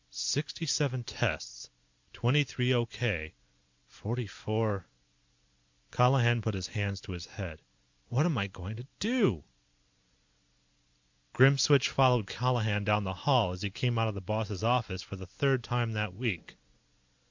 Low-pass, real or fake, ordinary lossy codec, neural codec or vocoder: 7.2 kHz; real; MP3, 64 kbps; none